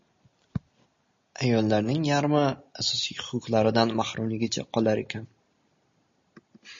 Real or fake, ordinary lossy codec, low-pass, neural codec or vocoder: real; MP3, 32 kbps; 7.2 kHz; none